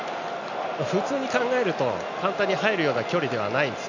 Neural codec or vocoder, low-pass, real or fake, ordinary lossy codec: none; 7.2 kHz; real; none